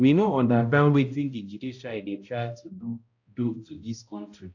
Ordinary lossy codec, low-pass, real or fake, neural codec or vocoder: AAC, 48 kbps; 7.2 kHz; fake; codec, 16 kHz, 0.5 kbps, X-Codec, HuBERT features, trained on balanced general audio